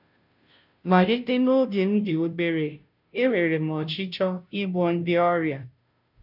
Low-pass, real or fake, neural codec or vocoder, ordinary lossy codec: 5.4 kHz; fake; codec, 16 kHz, 0.5 kbps, FunCodec, trained on Chinese and English, 25 frames a second; none